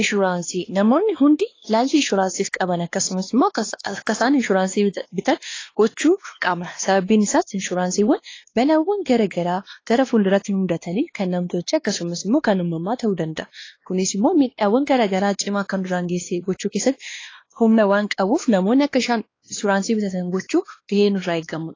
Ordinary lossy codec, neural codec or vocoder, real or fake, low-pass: AAC, 32 kbps; codec, 16 kHz, 2 kbps, X-Codec, WavLM features, trained on Multilingual LibriSpeech; fake; 7.2 kHz